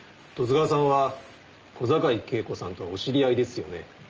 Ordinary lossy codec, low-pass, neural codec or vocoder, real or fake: Opus, 24 kbps; 7.2 kHz; none; real